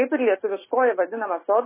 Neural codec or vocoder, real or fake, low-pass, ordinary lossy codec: none; real; 3.6 kHz; MP3, 16 kbps